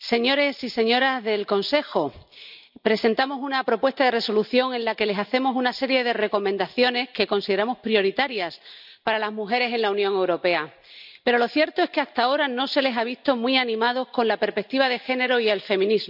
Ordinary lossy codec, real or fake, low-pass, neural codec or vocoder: none; real; 5.4 kHz; none